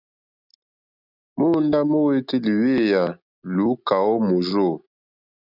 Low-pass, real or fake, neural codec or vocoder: 5.4 kHz; real; none